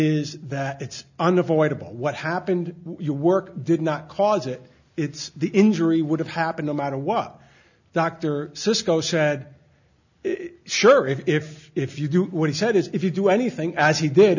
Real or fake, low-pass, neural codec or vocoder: real; 7.2 kHz; none